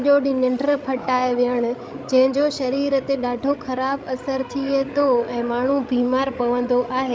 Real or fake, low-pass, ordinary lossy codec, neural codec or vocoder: fake; none; none; codec, 16 kHz, 16 kbps, FreqCodec, larger model